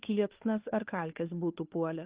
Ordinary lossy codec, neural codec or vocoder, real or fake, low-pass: Opus, 24 kbps; codec, 16 kHz, 2 kbps, FunCodec, trained on Chinese and English, 25 frames a second; fake; 3.6 kHz